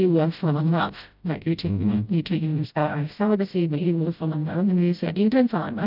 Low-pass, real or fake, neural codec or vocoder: 5.4 kHz; fake; codec, 16 kHz, 0.5 kbps, FreqCodec, smaller model